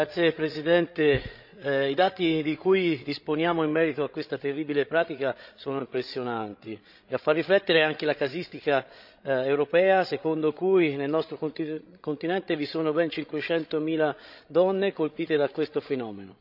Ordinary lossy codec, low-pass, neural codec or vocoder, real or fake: none; 5.4 kHz; codec, 16 kHz, 16 kbps, FreqCodec, larger model; fake